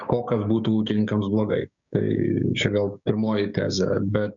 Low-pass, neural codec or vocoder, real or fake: 7.2 kHz; codec, 16 kHz, 6 kbps, DAC; fake